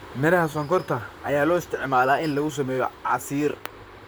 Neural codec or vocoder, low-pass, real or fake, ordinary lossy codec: vocoder, 44.1 kHz, 128 mel bands, Pupu-Vocoder; none; fake; none